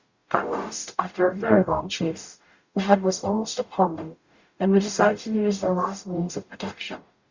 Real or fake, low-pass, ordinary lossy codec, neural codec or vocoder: fake; 7.2 kHz; Opus, 64 kbps; codec, 44.1 kHz, 0.9 kbps, DAC